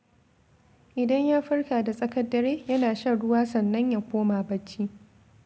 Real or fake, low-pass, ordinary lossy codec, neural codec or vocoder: real; none; none; none